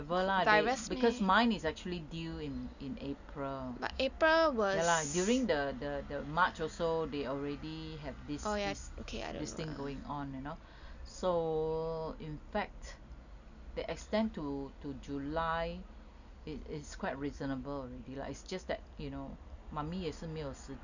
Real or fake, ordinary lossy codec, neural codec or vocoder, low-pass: real; none; none; 7.2 kHz